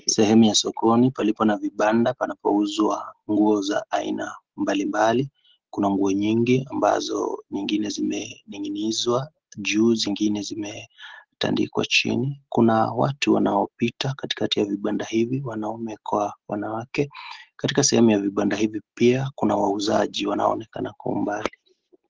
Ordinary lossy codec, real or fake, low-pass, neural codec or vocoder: Opus, 16 kbps; real; 7.2 kHz; none